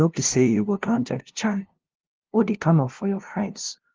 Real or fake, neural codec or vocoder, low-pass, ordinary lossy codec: fake; codec, 16 kHz, 0.5 kbps, FunCodec, trained on LibriTTS, 25 frames a second; 7.2 kHz; Opus, 16 kbps